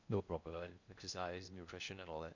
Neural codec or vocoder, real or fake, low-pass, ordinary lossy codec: codec, 16 kHz in and 24 kHz out, 0.6 kbps, FocalCodec, streaming, 2048 codes; fake; 7.2 kHz; none